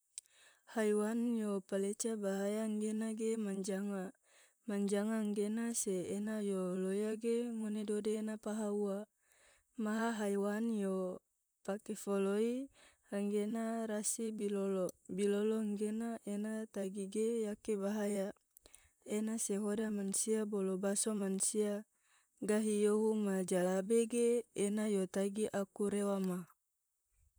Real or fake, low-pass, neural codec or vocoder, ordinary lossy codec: fake; none; vocoder, 44.1 kHz, 128 mel bands, Pupu-Vocoder; none